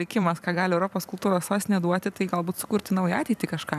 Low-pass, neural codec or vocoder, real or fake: 14.4 kHz; vocoder, 44.1 kHz, 128 mel bands every 256 samples, BigVGAN v2; fake